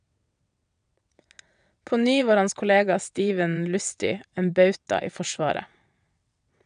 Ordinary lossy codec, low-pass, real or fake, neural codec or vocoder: none; 9.9 kHz; fake; vocoder, 22.05 kHz, 80 mel bands, WaveNeXt